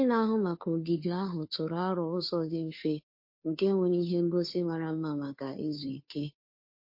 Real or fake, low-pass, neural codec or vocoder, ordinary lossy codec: fake; 5.4 kHz; codec, 16 kHz, 2 kbps, FunCodec, trained on Chinese and English, 25 frames a second; MP3, 32 kbps